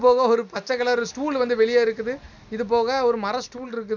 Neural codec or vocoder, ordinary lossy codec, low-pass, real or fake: none; none; 7.2 kHz; real